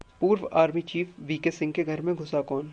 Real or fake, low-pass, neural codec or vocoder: real; 9.9 kHz; none